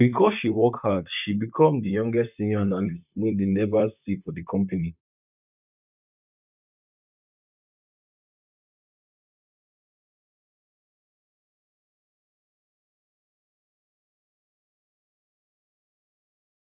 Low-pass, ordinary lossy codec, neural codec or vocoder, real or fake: 3.6 kHz; none; vocoder, 44.1 kHz, 128 mel bands, Pupu-Vocoder; fake